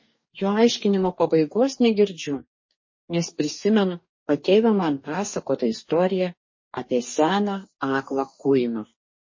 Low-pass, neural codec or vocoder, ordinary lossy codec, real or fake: 7.2 kHz; codec, 44.1 kHz, 2.6 kbps, DAC; MP3, 32 kbps; fake